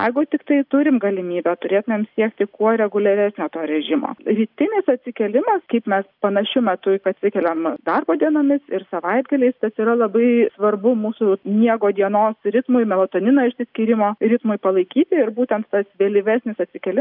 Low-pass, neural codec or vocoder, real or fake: 5.4 kHz; none; real